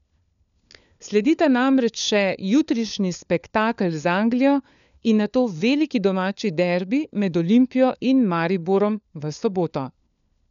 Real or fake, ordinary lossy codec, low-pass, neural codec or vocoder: fake; none; 7.2 kHz; codec, 16 kHz, 4 kbps, FunCodec, trained on LibriTTS, 50 frames a second